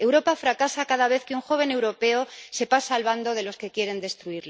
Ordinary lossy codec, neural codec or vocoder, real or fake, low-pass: none; none; real; none